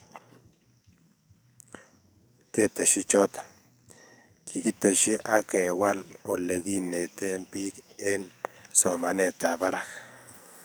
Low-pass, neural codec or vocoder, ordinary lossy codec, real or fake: none; codec, 44.1 kHz, 2.6 kbps, SNAC; none; fake